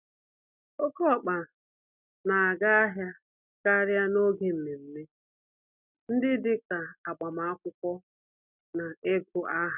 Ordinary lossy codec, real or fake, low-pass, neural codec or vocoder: none; real; 3.6 kHz; none